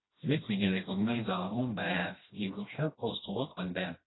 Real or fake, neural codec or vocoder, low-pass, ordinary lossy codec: fake; codec, 16 kHz, 1 kbps, FreqCodec, smaller model; 7.2 kHz; AAC, 16 kbps